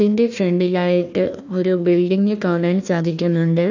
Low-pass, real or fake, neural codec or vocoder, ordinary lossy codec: 7.2 kHz; fake; codec, 16 kHz, 1 kbps, FunCodec, trained on Chinese and English, 50 frames a second; none